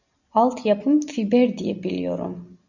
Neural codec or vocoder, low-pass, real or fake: none; 7.2 kHz; real